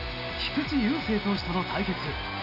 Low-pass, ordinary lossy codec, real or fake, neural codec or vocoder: 5.4 kHz; none; real; none